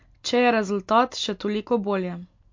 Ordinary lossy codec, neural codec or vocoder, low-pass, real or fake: MP3, 48 kbps; none; 7.2 kHz; real